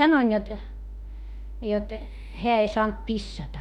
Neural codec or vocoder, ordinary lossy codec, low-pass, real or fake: autoencoder, 48 kHz, 32 numbers a frame, DAC-VAE, trained on Japanese speech; none; 19.8 kHz; fake